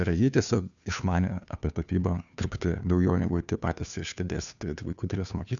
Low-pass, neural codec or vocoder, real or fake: 7.2 kHz; codec, 16 kHz, 2 kbps, FunCodec, trained on Chinese and English, 25 frames a second; fake